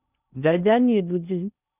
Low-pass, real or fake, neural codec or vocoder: 3.6 kHz; fake; codec, 16 kHz in and 24 kHz out, 0.6 kbps, FocalCodec, streaming, 2048 codes